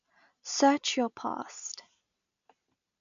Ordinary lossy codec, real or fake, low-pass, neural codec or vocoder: none; real; 7.2 kHz; none